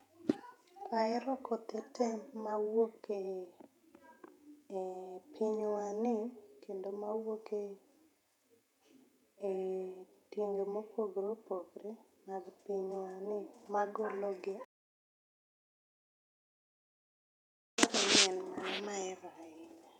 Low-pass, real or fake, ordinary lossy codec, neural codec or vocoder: 19.8 kHz; fake; none; vocoder, 48 kHz, 128 mel bands, Vocos